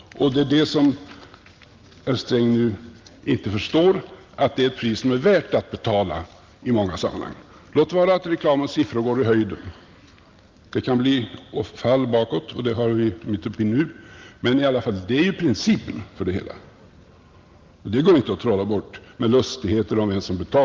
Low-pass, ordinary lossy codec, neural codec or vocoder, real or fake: 7.2 kHz; Opus, 24 kbps; none; real